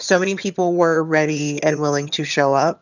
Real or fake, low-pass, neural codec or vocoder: fake; 7.2 kHz; vocoder, 22.05 kHz, 80 mel bands, HiFi-GAN